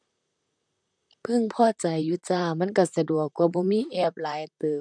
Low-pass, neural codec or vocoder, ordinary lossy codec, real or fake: 9.9 kHz; vocoder, 44.1 kHz, 128 mel bands, Pupu-Vocoder; none; fake